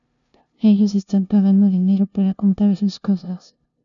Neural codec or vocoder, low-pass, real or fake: codec, 16 kHz, 0.5 kbps, FunCodec, trained on LibriTTS, 25 frames a second; 7.2 kHz; fake